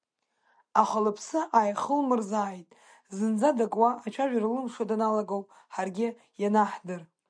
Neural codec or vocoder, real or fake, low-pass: none; real; 9.9 kHz